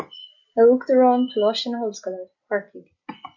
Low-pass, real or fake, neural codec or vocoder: 7.2 kHz; real; none